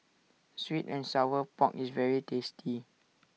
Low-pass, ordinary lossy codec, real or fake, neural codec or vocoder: none; none; real; none